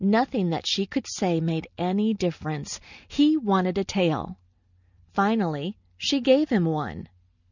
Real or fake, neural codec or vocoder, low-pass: real; none; 7.2 kHz